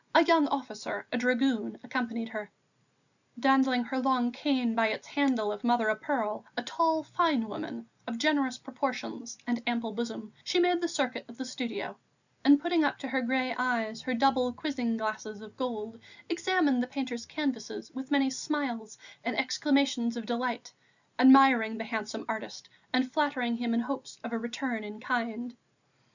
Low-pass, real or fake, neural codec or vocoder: 7.2 kHz; real; none